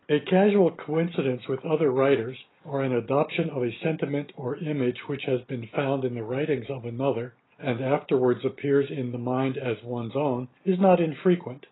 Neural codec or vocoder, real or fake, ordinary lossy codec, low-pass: none; real; AAC, 16 kbps; 7.2 kHz